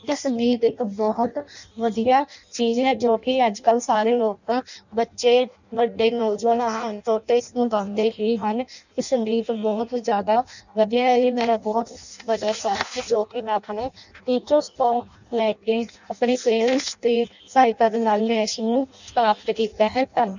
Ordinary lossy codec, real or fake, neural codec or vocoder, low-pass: none; fake; codec, 16 kHz in and 24 kHz out, 0.6 kbps, FireRedTTS-2 codec; 7.2 kHz